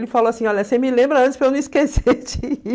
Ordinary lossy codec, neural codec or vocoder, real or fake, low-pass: none; none; real; none